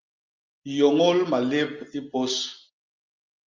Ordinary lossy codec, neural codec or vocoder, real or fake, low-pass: Opus, 32 kbps; none; real; 7.2 kHz